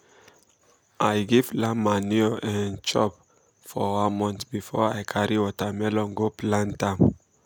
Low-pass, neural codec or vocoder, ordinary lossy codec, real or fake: none; none; none; real